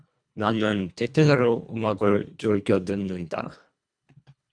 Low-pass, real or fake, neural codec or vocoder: 9.9 kHz; fake; codec, 24 kHz, 1.5 kbps, HILCodec